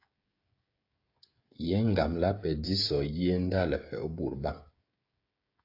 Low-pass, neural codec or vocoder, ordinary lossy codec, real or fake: 5.4 kHz; codec, 16 kHz, 16 kbps, FreqCodec, smaller model; AAC, 32 kbps; fake